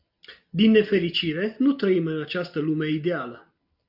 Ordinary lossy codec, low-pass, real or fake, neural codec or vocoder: AAC, 48 kbps; 5.4 kHz; real; none